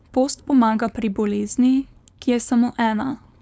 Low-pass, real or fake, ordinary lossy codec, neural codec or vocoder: none; fake; none; codec, 16 kHz, 4.8 kbps, FACodec